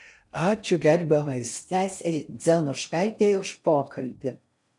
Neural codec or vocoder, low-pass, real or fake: codec, 16 kHz in and 24 kHz out, 0.6 kbps, FocalCodec, streaming, 4096 codes; 10.8 kHz; fake